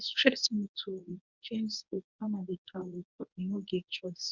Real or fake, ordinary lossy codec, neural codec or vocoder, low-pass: fake; none; codec, 24 kHz, 0.9 kbps, WavTokenizer, medium speech release version 1; 7.2 kHz